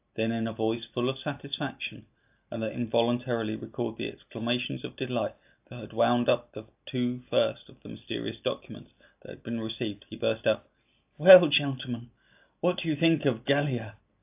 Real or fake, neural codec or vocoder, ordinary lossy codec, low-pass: real; none; AAC, 32 kbps; 3.6 kHz